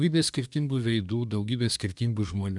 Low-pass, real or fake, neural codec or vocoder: 10.8 kHz; fake; codec, 24 kHz, 1 kbps, SNAC